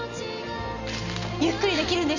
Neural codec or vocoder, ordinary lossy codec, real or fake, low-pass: none; none; real; 7.2 kHz